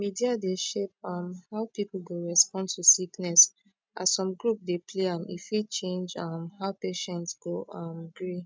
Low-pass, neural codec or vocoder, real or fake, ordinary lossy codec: none; none; real; none